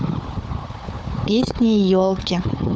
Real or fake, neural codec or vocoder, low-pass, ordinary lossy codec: fake; codec, 16 kHz, 4 kbps, FunCodec, trained on Chinese and English, 50 frames a second; none; none